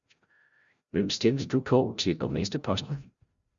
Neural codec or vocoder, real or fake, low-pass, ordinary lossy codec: codec, 16 kHz, 0.5 kbps, FreqCodec, larger model; fake; 7.2 kHz; Opus, 64 kbps